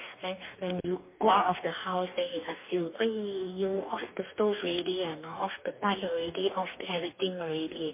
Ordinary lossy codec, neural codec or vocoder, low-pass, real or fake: MP3, 24 kbps; codec, 44.1 kHz, 2.6 kbps, DAC; 3.6 kHz; fake